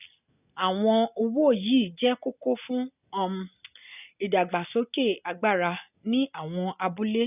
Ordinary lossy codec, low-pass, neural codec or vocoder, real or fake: none; 3.6 kHz; none; real